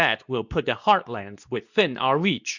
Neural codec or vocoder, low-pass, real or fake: codec, 24 kHz, 0.9 kbps, WavTokenizer, medium speech release version 2; 7.2 kHz; fake